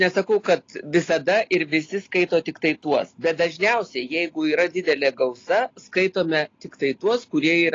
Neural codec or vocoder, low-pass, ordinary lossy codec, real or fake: none; 7.2 kHz; AAC, 32 kbps; real